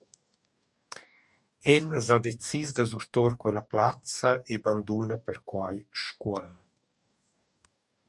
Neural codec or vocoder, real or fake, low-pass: codec, 44.1 kHz, 2.6 kbps, DAC; fake; 10.8 kHz